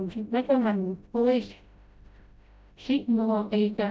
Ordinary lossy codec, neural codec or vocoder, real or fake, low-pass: none; codec, 16 kHz, 0.5 kbps, FreqCodec, smaller model; fake; none